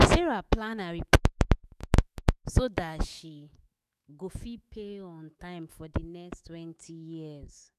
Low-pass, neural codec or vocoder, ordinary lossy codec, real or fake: 14.4 kHz; autoencoder, 48 kHz, 128 numbers a frame, DAC-VAE, trained on Japanese speech; none; fake